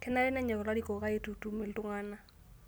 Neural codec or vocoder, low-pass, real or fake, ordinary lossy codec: none; none; real; none